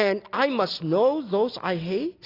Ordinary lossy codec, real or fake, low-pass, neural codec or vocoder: AAC, 24 kbps; real; 5.4 kHz; none